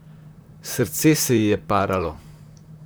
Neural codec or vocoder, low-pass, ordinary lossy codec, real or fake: vocoder, 44.1 kHz, 128 mel bands, Pupu-Vocoder; none; none; fake